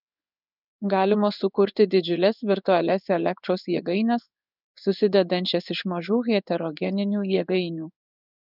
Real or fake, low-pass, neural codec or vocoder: fake; 5.4 kHz; codec, 16 kHz in and 24 kHz out, 1 kbps, XY-Tokenizer